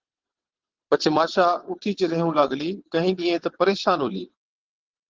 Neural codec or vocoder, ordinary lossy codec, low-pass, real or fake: vocoder, 22.05 kHz, 80 mel bands, WaveNeXt; Opus, 16 kbps; 7.2 kHz; fake